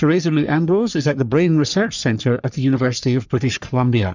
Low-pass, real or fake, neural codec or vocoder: 7.2 kHz; fake; codec, 44.1 kHz, 3.4 kbps, Pupu-Codec